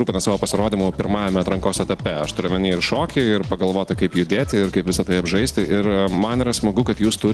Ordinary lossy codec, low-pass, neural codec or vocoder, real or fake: Opus, 16 kbps; 10.8 kHz; none; real